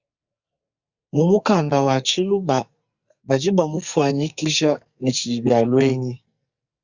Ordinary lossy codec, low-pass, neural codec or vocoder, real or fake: Opus, 64 kbps; 7.2 kHz; codec, 44.1 kHz, 2.6 kbps, SNAC; fake